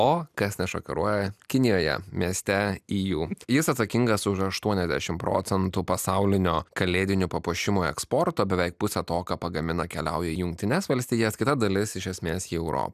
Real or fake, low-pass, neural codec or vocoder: real; 14.4 kHz; none